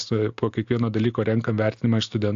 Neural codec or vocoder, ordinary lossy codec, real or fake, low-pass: none; AAC, 64 kbps; real; 7.2 kHz